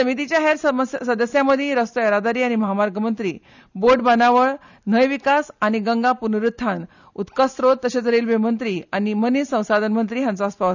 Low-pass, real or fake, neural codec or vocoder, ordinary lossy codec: 7.2 kHz; real; none; none